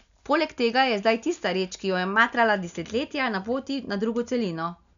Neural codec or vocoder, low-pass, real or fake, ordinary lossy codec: none; 7.2 kHz; real; none